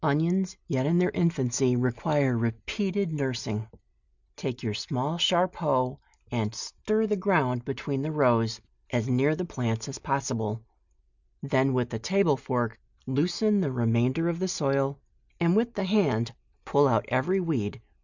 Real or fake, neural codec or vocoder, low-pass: real; none; 7.2 kHz